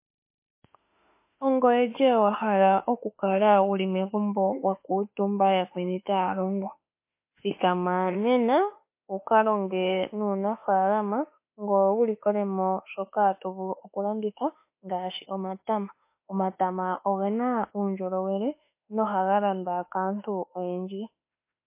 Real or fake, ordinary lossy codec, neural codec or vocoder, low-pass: fake; MP3, 24 kbps; autoencoder, 48 kHz, 32 numbers a frame, DAC-VAE, trained on Japanese speech; 3.6 kHz